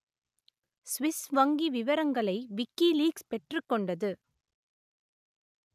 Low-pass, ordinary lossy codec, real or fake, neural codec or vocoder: 14.4 kHz; none; real; none